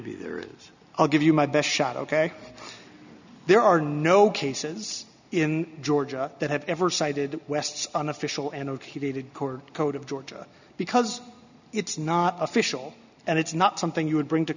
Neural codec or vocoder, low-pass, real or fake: none; 7.2 kHz; real